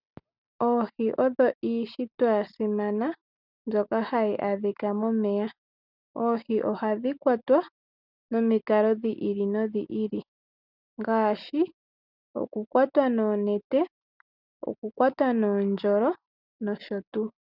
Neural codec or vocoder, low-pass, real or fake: none; 5.4 kHz; real